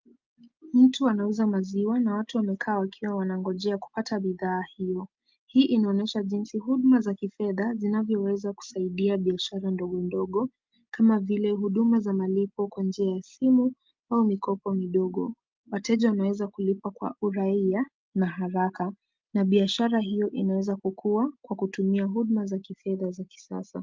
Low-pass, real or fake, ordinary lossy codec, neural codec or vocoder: 7.2 kHz; real; Opus, 24 kbps; none